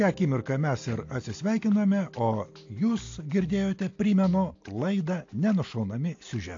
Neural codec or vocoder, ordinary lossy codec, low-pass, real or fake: none; AAC, 48 kbps; 7.2 kHz; real